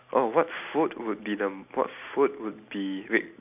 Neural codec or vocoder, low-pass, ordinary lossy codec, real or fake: none; 3.6 kHz; none; real